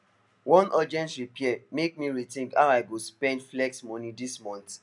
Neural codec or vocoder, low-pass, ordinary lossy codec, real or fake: none; 10.8 kHz; none; real